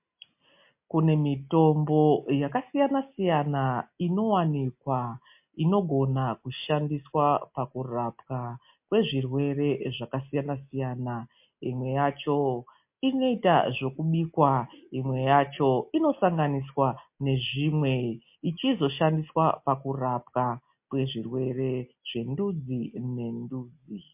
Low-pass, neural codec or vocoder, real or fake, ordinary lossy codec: 3.6 kHz; none; real; MP3, 32 kbps